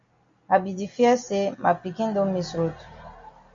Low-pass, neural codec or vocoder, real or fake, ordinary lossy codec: 7.2 kHz; none; real; MP3, 48 kbps